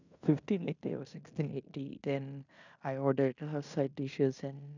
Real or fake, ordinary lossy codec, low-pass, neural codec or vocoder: fake; none; 7.2 kHz; codec, 16 kHz in and 24 kHz out, 0.9 kbps, LongCat-Audio-Codec, fine tuned four codebook decoder